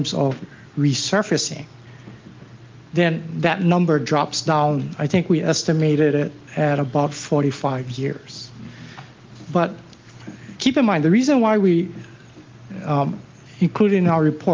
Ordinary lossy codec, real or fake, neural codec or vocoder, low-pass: Opus, 32 kbps; real; none; 7.2 kHz